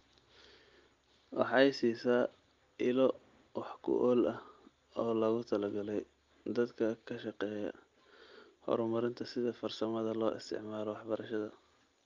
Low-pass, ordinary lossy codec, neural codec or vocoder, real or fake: 7.2 kHz; Opus, 32 kbps; none; real